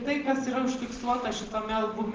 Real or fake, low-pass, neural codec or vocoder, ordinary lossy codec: real; 7.2 kHz; none; Opus, 16 kbps